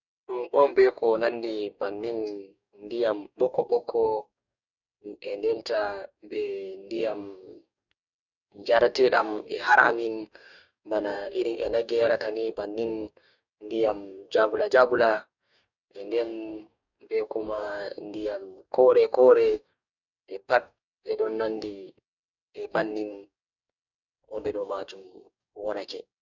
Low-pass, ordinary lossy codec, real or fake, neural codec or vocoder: 7.2 kHz; none; fake; codec, 44.1 kHz, 2.6 kbps, DAC